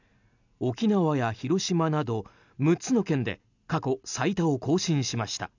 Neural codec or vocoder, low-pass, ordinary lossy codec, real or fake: none; 7.2 kHz; none; real